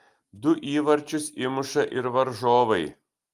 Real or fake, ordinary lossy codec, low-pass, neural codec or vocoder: real; Opus, 32 kbps; 14.4 kHz; none